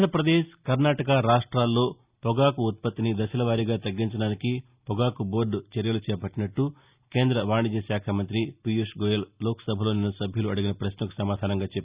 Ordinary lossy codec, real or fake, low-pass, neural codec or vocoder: Opus, 64 kbps; real; 3.6 kHz; none